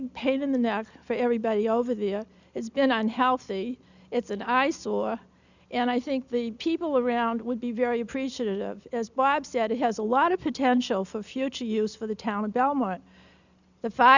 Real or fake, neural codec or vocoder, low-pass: real; none; 7.2 kHz